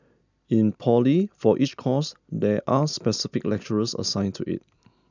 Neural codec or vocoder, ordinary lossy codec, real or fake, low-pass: none; none; real; 7.2 kHz